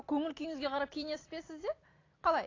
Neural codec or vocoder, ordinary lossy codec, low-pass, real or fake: none; AAC, 32 kbps; 7.2 kHz; real